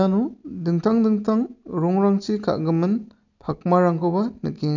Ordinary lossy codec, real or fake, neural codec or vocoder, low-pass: none; real; none; 7.2 kHz